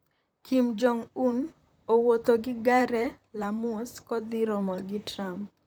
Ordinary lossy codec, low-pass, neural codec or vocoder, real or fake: none; none; vocoder, 44.1 kHz, 128 mel bands, Pupu-Vocoder; fake